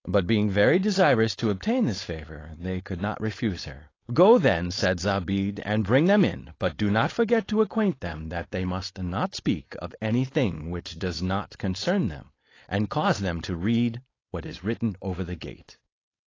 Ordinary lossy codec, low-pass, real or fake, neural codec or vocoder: AAC, 32 kbps; 7.2 kHz; fake; codec, 16 kHz, 4.8 kbps, FACodec